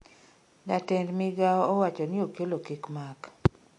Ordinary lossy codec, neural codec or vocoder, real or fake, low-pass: MP3, 48 kbps; none; real; 10.8 kHz